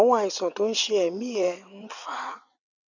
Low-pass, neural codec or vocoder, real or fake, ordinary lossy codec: 7.2 kHz; vocoder, 22.05 kHz, 80 mel bands, WaveNeXt; fake; none